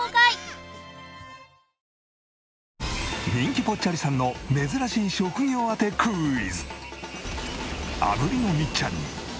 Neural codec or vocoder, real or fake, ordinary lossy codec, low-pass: none; real; none; none